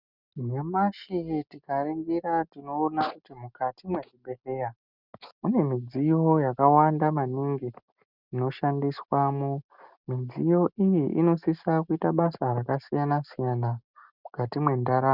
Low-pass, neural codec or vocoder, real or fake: 5.4 kHz; none; real